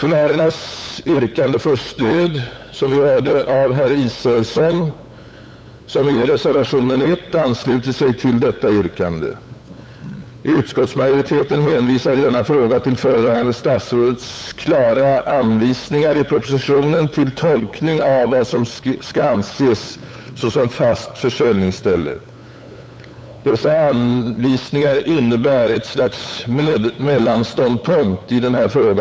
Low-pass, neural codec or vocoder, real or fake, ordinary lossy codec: none; codec, 16 kHz, 8 kbps, FunCodec, trained on LibriTTS, 25 frames a second; fake; none